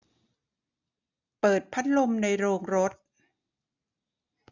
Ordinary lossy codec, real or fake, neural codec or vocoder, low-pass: none; real; none; 7.2 kHz